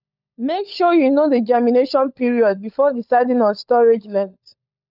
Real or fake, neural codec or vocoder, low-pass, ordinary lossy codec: fake; codec, 16 kHz, 16 kbps, FunCodec, trained on LibriTTS, 50 frames a second; 5.4 kHz; none